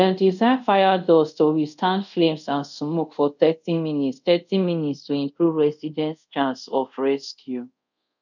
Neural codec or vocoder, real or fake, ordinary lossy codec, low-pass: codec, 24 kHz, 0.5 kbps, DualCodec; fake; none; 7.2 kHz